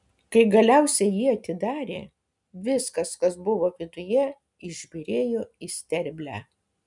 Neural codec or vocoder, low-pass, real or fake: none; 10.8 kHz; real